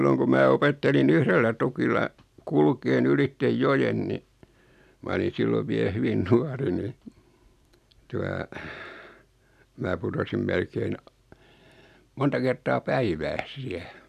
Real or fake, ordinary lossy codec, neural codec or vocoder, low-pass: real; none; none; 14.4 kHz